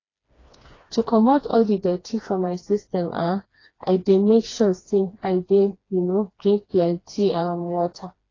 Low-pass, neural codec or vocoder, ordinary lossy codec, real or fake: 7.2 kHz; codec, 16 kHz, 2 kbps, FreqCodec, smaller model; AAC, 32 kbps; fake